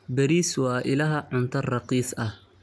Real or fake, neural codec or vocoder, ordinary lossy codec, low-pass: real; none; none; none